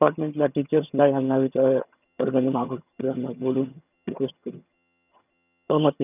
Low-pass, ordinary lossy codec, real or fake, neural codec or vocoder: 3.6 kHz; none; fake; vocoder, 22.05 kHz, 80 mel bands, HiFi-GAN